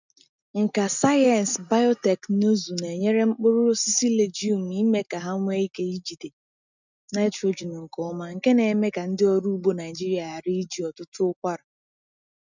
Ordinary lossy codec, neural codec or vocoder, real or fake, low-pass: none; none; real; 7.2 kHz